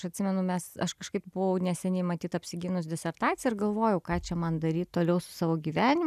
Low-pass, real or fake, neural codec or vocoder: 14.4 kHz; real; none